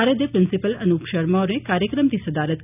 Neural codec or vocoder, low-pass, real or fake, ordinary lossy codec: none; 3.6 kHz; real; none